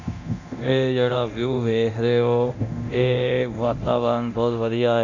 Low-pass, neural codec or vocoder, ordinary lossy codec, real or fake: 7.2 kHz; codec, 24 kHz, 0.9 kbps, DualCodec; none; fake